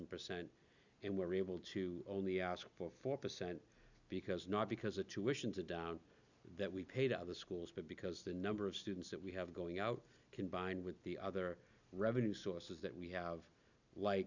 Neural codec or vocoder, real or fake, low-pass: none; real; 7.2 kHz